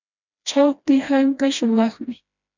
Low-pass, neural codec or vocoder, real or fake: 7.2 kHz; codec, 16 kHz, 1 kbps, FreqCodec, smaller model; fake